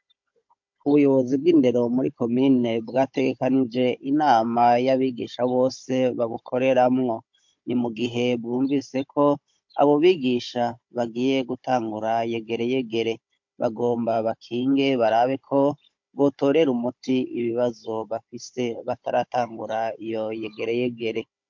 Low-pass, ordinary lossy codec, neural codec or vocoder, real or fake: 7.2 kHz; MP3, 48 kbps; codec, 16 kHz, 16 kbps, FunCodec, trained on Chinese and English, 50 frames a second; fake